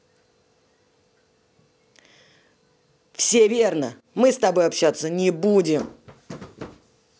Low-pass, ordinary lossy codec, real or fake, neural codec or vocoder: none; none; real; none